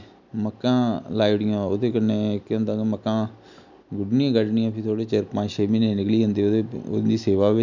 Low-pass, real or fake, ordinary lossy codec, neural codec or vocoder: 7.2 kHz; real; none; none